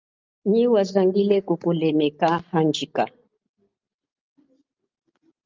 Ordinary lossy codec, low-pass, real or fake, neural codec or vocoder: Opus, 24 kbps; 7.2 kHz; real; none